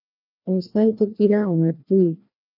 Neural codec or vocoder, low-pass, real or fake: codec, 16 kHz, 1 kbps, FreqCodec, larger model; 5.4 kHz; fake